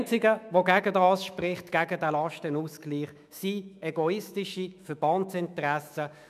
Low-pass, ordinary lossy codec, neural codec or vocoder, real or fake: 14.4 kHz; none; autoencoder, 48 kHz, 128 numbers a frame, DAC-VAE, trained on Japanese speech; fake